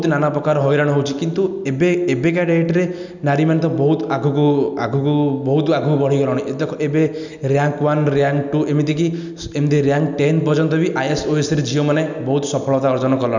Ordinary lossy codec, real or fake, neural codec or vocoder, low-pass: none; real; none; 7.2 kHz